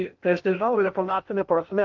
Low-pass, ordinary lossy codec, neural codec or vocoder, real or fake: 7.2 kHz; Opus, 24 kbps; codec, 16 kHz in and 24 kHz out, 0.8 kbps, FocalCodec, streaming, 65536 codes; fake